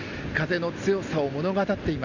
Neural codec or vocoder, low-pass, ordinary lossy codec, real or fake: none; 7.2 kHz; none; real